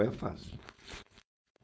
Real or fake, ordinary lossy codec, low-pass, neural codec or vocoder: fake; none; none; codec, 16 kHz, 4.8 kbps, FACodec